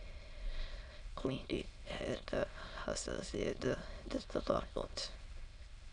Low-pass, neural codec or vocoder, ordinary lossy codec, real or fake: 9.9 kHz; autoencoder, 22.05 kHz, a latent of 192 numbers a frame, VITS, trained on many speakers; none; fake